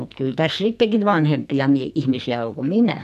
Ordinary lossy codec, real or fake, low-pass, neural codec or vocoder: MP3, 96 kbps; fake; 14.4 kHz; codec, 44.1 kHz, 2.6 kbps, SNAC